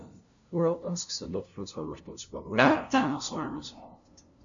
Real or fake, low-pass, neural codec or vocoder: fake; 7.2 kHz; codec, 16 kHz, 0.5 kbps, FunCodec, trained on LibriTTS, 25 frames a second